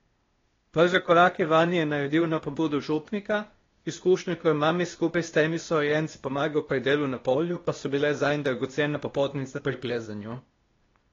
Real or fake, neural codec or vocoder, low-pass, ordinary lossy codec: fake; codec, 16 kHz, 0.8 kbps, ZipCodec; 7.2 kHz; AAC, 32 kbps